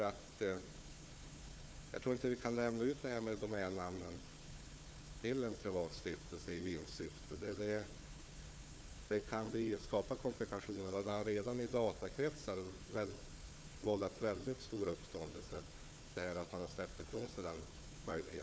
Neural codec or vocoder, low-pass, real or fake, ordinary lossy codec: codec, 16 kHz, 4 kbps, FunCodec, trained on Chinese and English, 50 frames a second; none; fake; none